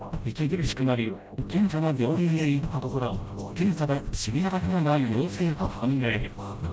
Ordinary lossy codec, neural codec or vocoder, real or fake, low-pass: none; codec, 16 kHz, 0.5 kbps, FreqCodec, smaller model; fake; none